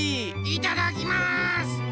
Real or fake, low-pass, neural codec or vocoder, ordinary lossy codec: real; none; none; none